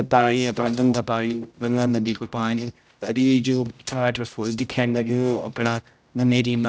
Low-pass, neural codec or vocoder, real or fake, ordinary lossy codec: none; codec, 16 kHz, 0.5 kbps, X-Codec, HuBERT features, trained on general audio; fake; none